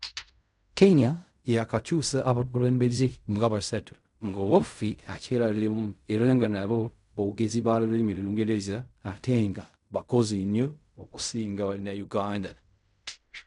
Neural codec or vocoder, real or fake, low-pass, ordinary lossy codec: codec, 16 kHz in and 24 kHz out, 0.4 kbps, LongCat-Audio-Codec, fine tuned four codebook decoder; fake; 10.8 kHz; none